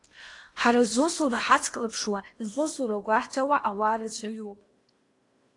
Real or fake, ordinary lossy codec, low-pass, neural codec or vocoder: fake; AAC, 48 kbps; 10.8 kHz; codec, 16 kHz in and 24 kHz out, 0.8 kbps, FocalCodec, streaming, 65536 codes